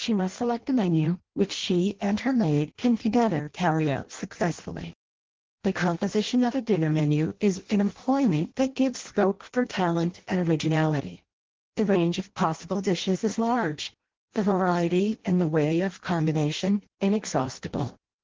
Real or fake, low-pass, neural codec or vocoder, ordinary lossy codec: fake; 7.2 kHz; codec, 16 kHz in and 24 kHz out, 0.6 kbps, FireRedTTS-2 codec; Opus, 16 kbps